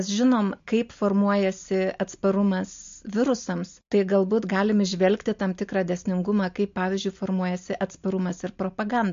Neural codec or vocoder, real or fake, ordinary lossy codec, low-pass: none; real; MP3, 48 kbps; 7.2 kHz